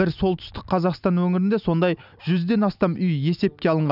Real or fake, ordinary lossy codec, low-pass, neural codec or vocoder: real; none; 5.4 kHz; none